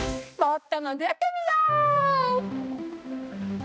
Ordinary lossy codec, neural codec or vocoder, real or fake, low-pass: none; codec, 16 kHz, 1 kbps, X-Codec, HuBERT features, trained on balanced general audio; fake; none